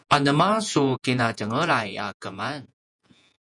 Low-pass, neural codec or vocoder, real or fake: 10.8 kHz; vocoder, 48 kHz, 128 mel bands, Vocos; fake